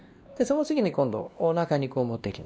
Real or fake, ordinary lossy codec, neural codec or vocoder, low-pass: fake; none; codec, 16 kHz, 1 kbps, X-Codec, WavLM features, trained on Multilingual LibriSpeech; none